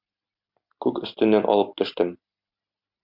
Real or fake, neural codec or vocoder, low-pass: real; none; 5.4 kHz